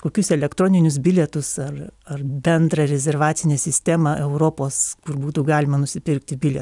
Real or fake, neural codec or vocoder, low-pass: real; none; 10.8 kHz